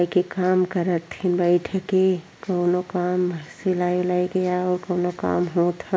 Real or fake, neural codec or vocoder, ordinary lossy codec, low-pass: real; none; none; none